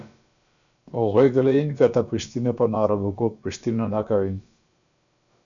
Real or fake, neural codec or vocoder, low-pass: fake; codec, 16 kHz, about 1 kbps, DyCAST, with the encoder's durations; 7.2 kHz